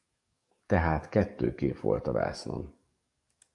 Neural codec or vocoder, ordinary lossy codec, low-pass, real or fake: codec, 44.1 kHz, 7.8 kbps, DAC; AAC, 64 kbps; 10.8 kHz; fake